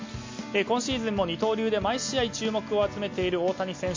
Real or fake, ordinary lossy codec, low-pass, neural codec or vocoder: real; MP3, 48 kbps; 7.2 kHz; none